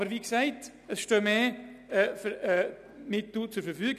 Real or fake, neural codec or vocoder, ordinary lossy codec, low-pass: real; none; none; 14.4 kHz